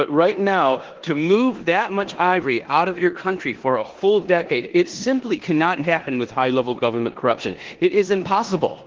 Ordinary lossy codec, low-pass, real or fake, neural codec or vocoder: Opus, 16 kbps; 7.2 kHz; fake; codec, 16 kHz in and 24 kHz out, 0.9 kbps, LongCat-Audio-Codec, four codebook decoder